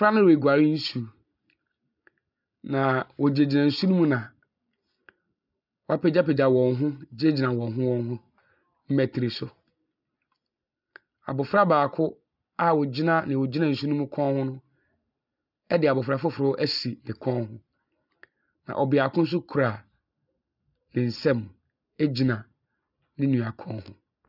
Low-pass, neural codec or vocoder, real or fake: 5.4 kHz; none; real